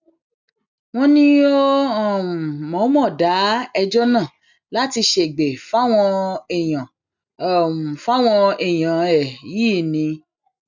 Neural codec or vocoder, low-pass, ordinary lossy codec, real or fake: none; 7.2 kHz; none; real